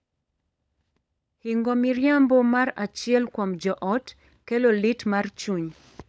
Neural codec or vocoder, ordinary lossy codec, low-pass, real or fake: codec, 16 kHz, 16 kbps, FunCodec, trained on LibriTTS, 50 frames a second; none; none; fake